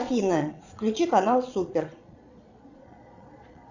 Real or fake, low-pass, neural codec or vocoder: fake; 7.2 kHz; vocoder, 22.05 kHz, 80 mel bands, Vocos